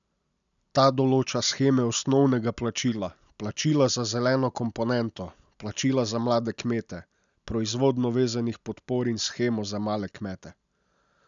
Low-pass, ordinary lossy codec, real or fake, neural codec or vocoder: 7.2 kHz; none; real; none